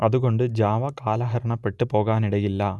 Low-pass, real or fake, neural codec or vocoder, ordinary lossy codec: none; real; none; none